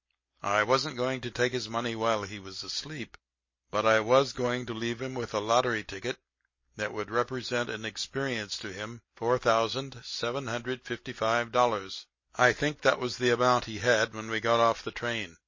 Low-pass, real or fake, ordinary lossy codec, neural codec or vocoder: 7.2 kHz; real; MP3, 32 kbps; none